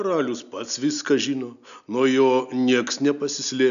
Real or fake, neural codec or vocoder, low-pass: real; none; 7.2 kHz